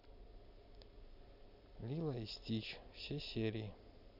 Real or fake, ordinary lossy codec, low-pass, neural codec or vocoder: real; none; 5.4 kHz; none